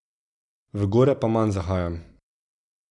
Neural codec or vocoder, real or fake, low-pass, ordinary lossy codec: none; real; 10.8 kHz; none